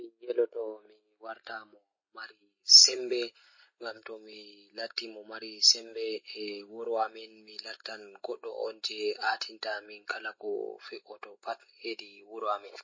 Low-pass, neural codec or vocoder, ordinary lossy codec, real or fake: 7.2 kHz; none; MP3, 32 kbps; real